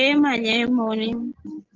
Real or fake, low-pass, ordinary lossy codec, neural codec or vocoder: real; 7.2 kHz; Opus, 16 kbps; none